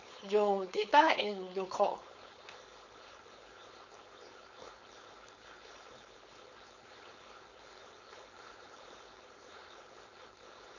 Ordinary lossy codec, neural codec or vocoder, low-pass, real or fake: none; codec, 16 kHz, 4.8 kbps, FACodec; 7.2 kHz; fake